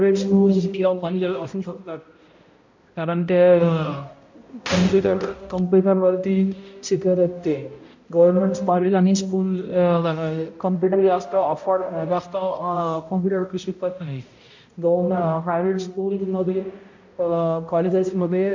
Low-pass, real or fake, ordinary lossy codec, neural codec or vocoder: 7.2 kHz; fake; MP3, 48 kbps; codec, 16 kHz, 0.5 kbps, X-Codec, HuBERT features, trained on balanced general audio